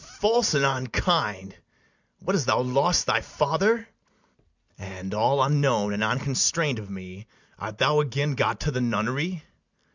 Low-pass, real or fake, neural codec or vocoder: 7.2 kHz; real; none